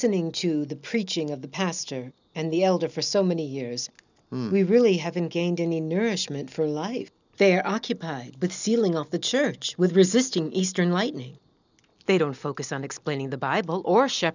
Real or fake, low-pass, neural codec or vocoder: real; 7.2 kHz; none